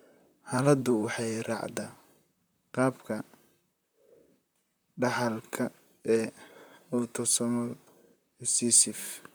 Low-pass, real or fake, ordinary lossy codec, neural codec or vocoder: none; fake; none; vocoder, 44.1 kHz, 128 mel bands, Pupu-Vocoder